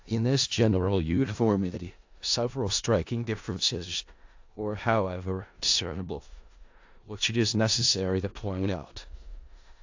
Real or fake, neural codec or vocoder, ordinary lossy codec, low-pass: fake; codec, 16 kHz in and 24 kHz out, 0.4 kbps, LongCat-Audio-Codec, four codebook decoder; AAC, 48 kbps; 7.2 kHz